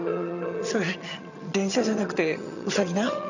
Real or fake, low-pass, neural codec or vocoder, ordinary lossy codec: fake; 7.2 kHz; vocoder, 22.05 kHz, 80 mel bands, HiFi-GAN; none